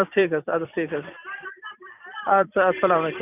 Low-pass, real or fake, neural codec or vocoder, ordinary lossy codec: 3.6 kHz; fake; vocoder, 44.1 kHz, 128 mel bands every 256 samples, BigVGAN v2; none